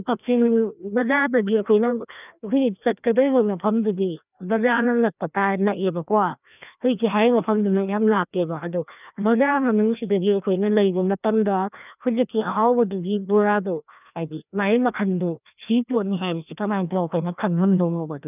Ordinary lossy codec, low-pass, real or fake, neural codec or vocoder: none; 3.6 kHz; fake; codec, 16 kHz, 1 kbps, FreqCodec, larger model